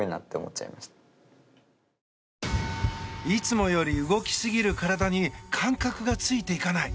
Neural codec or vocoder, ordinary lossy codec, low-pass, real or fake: none; none; none; real